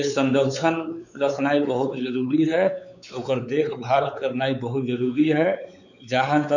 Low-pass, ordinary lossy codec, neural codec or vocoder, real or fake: 7.2 kHz; none; codec, 16 kHz, 4 kbps, X-Codec, WavLM features, trained on Multilingual LibriSpeech; fake